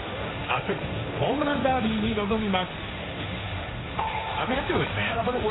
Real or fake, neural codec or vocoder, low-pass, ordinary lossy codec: fake; codec, 16 kHz, 1.1 kbps, Voila-Tokenizer; 7.2 kHz; AAC, 16 kbps